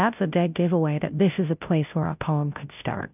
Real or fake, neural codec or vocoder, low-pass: fake; codec, 16 kHz, 0.5 kbps, FunCodec, trained on Chinese and English, 25 frames a second; 3.6 kHz